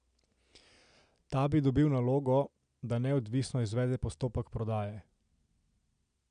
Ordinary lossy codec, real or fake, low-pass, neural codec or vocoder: none; real; 9.9 kHz; none